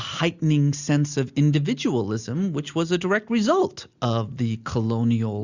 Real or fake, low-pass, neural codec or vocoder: real; 7.2 kHz; none